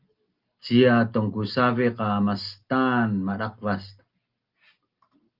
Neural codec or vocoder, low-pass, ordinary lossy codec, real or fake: none; 5.4 kHz; Opus, 32 kbps; real